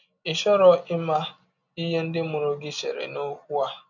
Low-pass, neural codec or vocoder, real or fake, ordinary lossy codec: 7.2 kHz; none; real; none